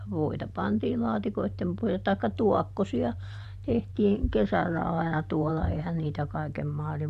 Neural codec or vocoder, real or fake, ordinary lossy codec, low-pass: vocoder, 44.1 kHz, 128 mel bands every 512 samples, BigVGAN v2; fake; none; 14.4 kHz